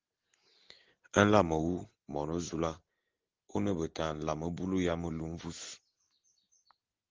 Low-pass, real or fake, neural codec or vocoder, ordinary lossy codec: 7.2 kHz; real; none; Opus, 16 kbps